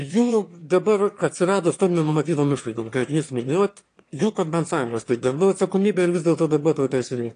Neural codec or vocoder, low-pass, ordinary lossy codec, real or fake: autoencoder, 22.05 kHz, a latent of 192 numbers a frame, VITS, trained on one speaker; 9.9 kHz; AAC, 48 kbps; fake